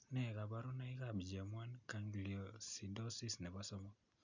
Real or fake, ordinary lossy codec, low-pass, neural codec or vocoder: real; none; 7.2 kHz; none